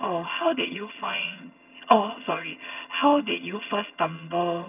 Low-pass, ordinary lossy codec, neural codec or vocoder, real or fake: 3.6 kHz; none; vocoder, 22.05 kHz, 80 mel bands, HiFi-GAN; fake